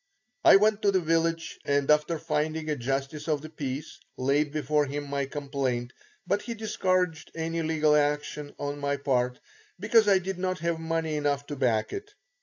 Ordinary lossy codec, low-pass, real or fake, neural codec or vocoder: AAC, 48 kbps; 7.2 kHz; real; none